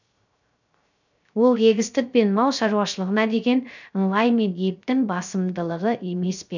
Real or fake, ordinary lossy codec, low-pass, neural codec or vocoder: fake; none; 7.2 kHz; codec, 16 kHz, 0.3 kbps, FocalCodec